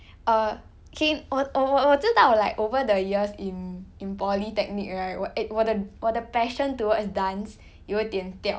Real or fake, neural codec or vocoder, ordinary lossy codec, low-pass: real; none; none; none